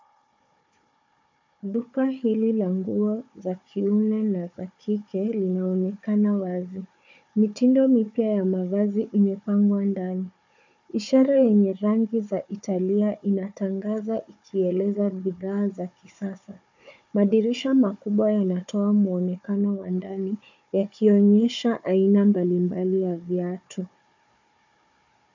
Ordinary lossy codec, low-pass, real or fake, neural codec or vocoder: MP3, 64 kbps; 7.2 kHz; fake; codec, 16 kHz, 4 kbps, FunCodec, trained on Chinese and English, 50 frames a second